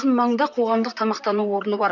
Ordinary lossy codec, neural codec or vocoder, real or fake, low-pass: none; vocoder, 44.1 kHz, 128 mel bands, Pupu-Vocoder; fake; 7.2 kHz